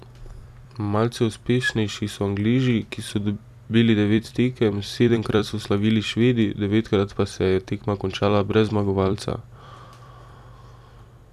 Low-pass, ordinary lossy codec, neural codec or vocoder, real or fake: 14.4 kHz; none; vocoder, 44.1 kHz, 128 mel bands every 256 samples, BigVGAN v2; fake